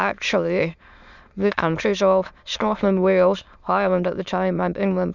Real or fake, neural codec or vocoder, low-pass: fake; autoencoder, 22.05 kHz, a latent of 192 numbers a frame, VITS, trained on many speakers; 7.2 kHz